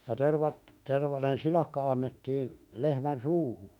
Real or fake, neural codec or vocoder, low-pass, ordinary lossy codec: fake; autoencoder, 48 kHz, 32 numbers a frame, DAC-VAE, trained on Japanese speech; 19.8 kHz; none